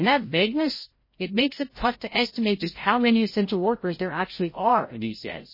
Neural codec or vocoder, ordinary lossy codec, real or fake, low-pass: codec, 16 kHz, 0.5 kbps, FreqCodec, larger model; MP3, 24 kbps; fake; 5.4 kHz